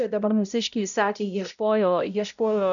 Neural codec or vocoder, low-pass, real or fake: codec, 16 kHz, 0.5 kbps, X-Codec, HuBERT features, trained on balanced general audio; 7.2 kHz; fake